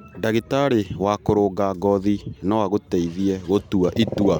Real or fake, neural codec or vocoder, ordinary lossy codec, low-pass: real; none; none; 19.8 kHz